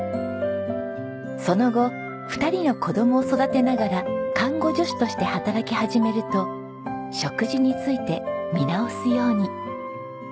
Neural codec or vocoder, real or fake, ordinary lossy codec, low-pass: none; real; none; none